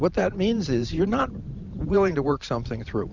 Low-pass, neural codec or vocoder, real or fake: 7.2 kHz; vocoder, 44.1 kHz, 128 mel bands every 512 samples, BigVGAN v2; fake